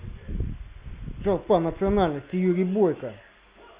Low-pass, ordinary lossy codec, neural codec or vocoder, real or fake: 3.6 kHz; Opus, 64 kbps; none; real